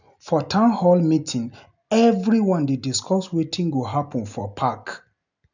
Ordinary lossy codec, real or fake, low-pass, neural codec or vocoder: none; real; 7.2 kHz; none